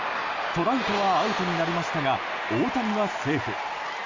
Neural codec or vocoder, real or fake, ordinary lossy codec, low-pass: none; real; Opus, 32 kbps; 7.2 kHz